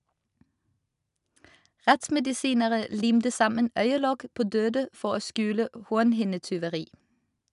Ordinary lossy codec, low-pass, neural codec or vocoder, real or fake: none; 10.8 kHz; none; real